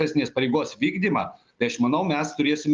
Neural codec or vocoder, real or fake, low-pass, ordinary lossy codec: none; real; 7.2 kHz; Opus, 32 kbps